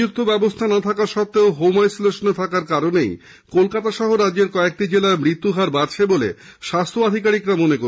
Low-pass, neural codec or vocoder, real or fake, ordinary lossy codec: none; none; real; none